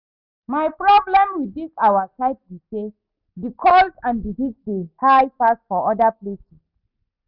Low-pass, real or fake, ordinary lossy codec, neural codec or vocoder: 5.4 kHz; real; none; none